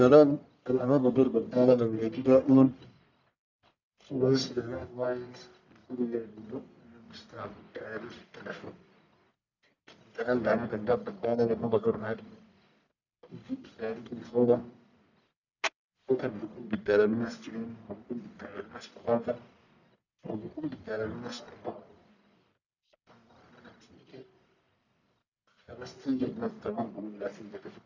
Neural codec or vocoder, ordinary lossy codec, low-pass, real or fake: codec, 44.1 kHz, 1.7 kbps, Pupu-Codec; none; 7.2 kHz; fake